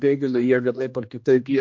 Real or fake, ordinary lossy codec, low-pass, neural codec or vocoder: fake; MP3, 48 kbps; 7.2 kHz; codec, 16 kHz, 1 kbps, X-Codec, HuBERT features, trained on general audio